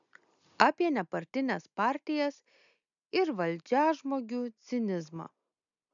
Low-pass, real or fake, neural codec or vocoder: 7.2 kHz; real; none